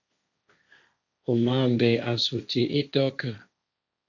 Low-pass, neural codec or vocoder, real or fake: 7.2 kHz; codec, 16 kHz, 1.1 kbps, Voila-Tokenizer; fake